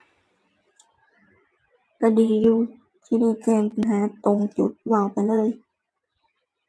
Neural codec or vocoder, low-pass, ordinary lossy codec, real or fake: vocoder, 22.05 kHz, 80 mel bands, WaveNeXt; none; none; fake